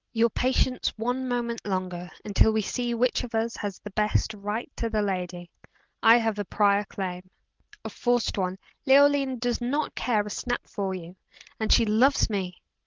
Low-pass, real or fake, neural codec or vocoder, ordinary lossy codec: 7.2 kHz; real; none; Opus, 24 kbps